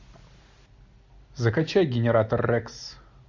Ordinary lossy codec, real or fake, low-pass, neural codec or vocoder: MP3, 48 kbps; real; 7.2 kHz; none